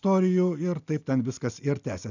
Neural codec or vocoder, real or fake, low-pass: none; real; 7.2 kHz